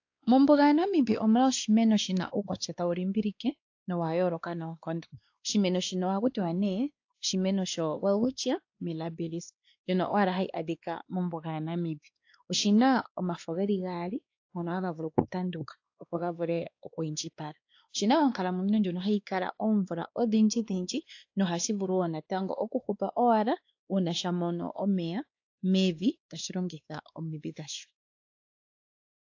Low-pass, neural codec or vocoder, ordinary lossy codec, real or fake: 7.2 kHz; codec, 16 kHz, 2 kbps, X-Codec, WavLM features, trained on Multilingual LibriSpeech; AAC, 48 kbps; fake